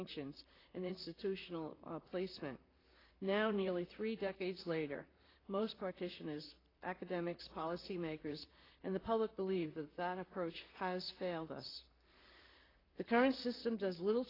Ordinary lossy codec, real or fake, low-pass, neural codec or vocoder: AAC, 24 kbps; fake; 5.4 kHz; vocoder, 44.1 kHz, 128 mel bands, Pupu-Vocoder